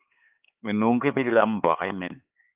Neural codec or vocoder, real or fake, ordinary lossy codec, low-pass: codec, 16 kHz, 4 kbps, X-Codec, HuBERT features, trained on LibriSpeech; fake; Opus, 32 kbps; 3.6 kHz